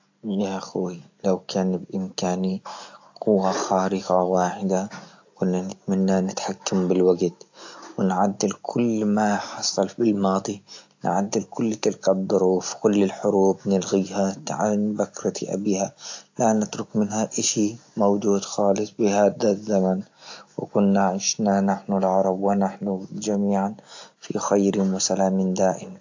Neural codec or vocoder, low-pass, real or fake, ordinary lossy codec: none; 7.2 kHz; real; AAC, 48 kbps